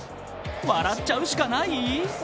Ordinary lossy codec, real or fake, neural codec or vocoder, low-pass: none; real; none; none